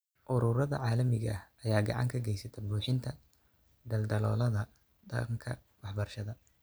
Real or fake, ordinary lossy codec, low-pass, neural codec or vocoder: real; none; none; none